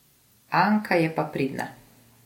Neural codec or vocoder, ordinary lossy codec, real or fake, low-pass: none; MP3, 64 kbps; real; 19.8 kHz